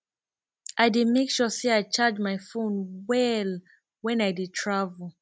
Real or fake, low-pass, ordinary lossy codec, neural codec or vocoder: real; none; none; none